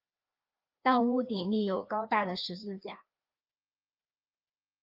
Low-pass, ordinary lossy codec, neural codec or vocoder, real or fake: 5.4 kHz; Opus, 24 kbps; codec, 16 kHz, 2 kbps, FreqCodec, larger model; fake